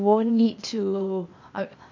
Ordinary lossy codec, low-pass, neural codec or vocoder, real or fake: MP3, 48 kbps; 7.2 kHz; codec, 16 kHz, 0.8 kbps, ZipCodec; fake